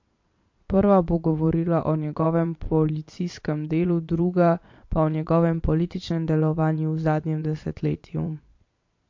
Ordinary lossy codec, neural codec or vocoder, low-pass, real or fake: MP3, 48 kbps; vocoder, 22.05 kHz, 80 mel bands, Vocos; 7.2 kHz; fake